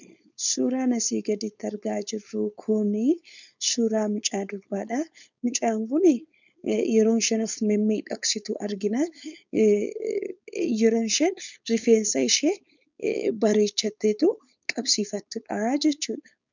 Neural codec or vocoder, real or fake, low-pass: codec, 16 kHz, 4.8 kbps, FACodec; fake; 7.2 kHz